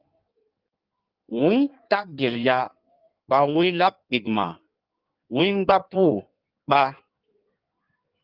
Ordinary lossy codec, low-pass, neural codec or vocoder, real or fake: Opus, 32 kbps; 5.4 kHz; codec, 16 kHz in and 24 kHz out, 1.1 kbps, FireRedTTS-2 codec; fake